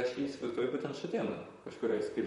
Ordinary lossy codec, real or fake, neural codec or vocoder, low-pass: MP3, 48 kbps; fake; vocoder, 44.1 kHz, 128 mel bands, Pupu-Vocoder; 19.8 kHz